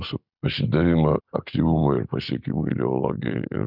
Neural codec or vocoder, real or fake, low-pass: none; real; 5.4 kHz